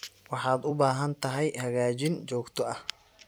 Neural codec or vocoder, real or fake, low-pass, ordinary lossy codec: vocoder, 44.1 kHz, 128 mel bands every 256 samples, BigVGAN v2; fake; none; none